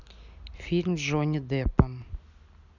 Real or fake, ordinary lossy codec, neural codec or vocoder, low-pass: real; none; none; 7.2 kHz